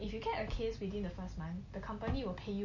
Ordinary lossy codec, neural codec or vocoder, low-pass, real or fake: MP3, 32 kbps; none; 7.2 kHz; real